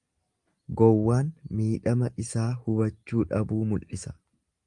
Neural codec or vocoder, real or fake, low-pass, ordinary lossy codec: none; real; 10.8 kHz; Opus, 32 kbps